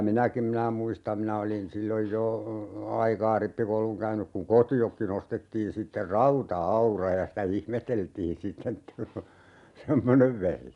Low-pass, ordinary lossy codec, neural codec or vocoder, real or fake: 10.8 kHz; none; none; real